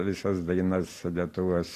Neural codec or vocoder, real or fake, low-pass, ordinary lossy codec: none; real; 14.4 kHz; AAC, 48 kbps